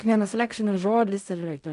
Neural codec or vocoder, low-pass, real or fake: codec, 16 kHz in and 24 kHz out, 0.4 kbps, LongCat-Audio-Codec, fine tuned four codebook decoder; 10.8 kHz; fake